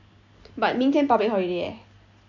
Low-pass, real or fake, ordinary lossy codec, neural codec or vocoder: 7.2 kHz; real; none; none